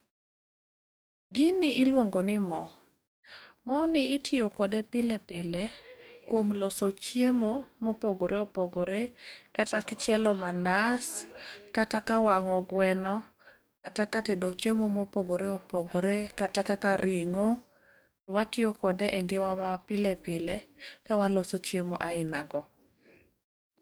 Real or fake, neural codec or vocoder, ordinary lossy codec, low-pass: fake; codec, 44.1 kHz, 2.6 kbps, DAC; none; none